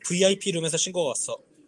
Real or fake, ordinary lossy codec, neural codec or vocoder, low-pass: real; Opus, 32 kbps; none; 10.8 kHz